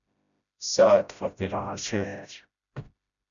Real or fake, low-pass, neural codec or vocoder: fake; 7.2 kHz; codec, 16 kHz, 0.5 kbps, FreqCodec, smaller model